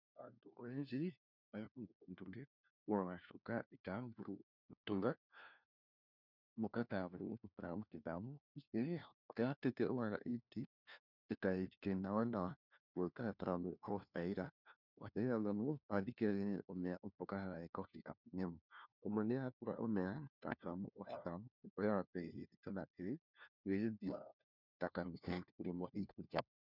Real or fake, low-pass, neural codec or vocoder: fake; 5.4 kHz; codec, 16 kHz, 1 kbps, FunCodec, trained on LibriTTS, 50 frames a second